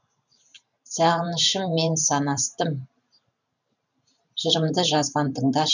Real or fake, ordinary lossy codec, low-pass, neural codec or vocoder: fake; none; 7.2 kHz; vocoder, 44.1 kHz, 128 mel bands every 512 samples, BigVGAN v2